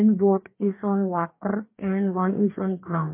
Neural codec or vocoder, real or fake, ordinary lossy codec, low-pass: codec, 44.1 kHz, 2.6 kbps, DAC; fake; MP3, 24 kbps; 3.6 kHz